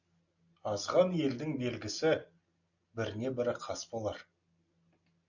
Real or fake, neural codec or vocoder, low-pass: real; none; 7.2 kHz